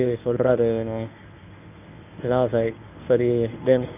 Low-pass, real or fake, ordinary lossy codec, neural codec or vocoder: 3.6 kHz; fake; none; codec, 24 kHz, 0.9 kbps, WavTokenizer, medium speech release version 1